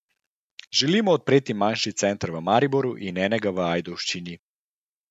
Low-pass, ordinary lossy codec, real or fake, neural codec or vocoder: 14.4 kHz; none; real; none